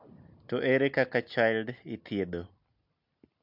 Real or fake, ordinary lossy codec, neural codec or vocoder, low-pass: real; MP3, 48 kbps; none; 5.4 kHz